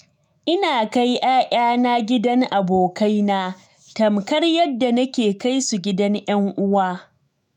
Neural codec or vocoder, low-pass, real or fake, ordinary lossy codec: autoencoder, 48 kHz, 128 numbers a frame, DAC-VAE, trained on Japanese speech; 19.8 kHz; fake; none